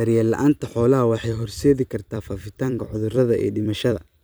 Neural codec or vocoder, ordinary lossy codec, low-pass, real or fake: vocoder, 44.1 kHz, 128 mel bands every 256 samples, BigVGAN v2; none; none; fake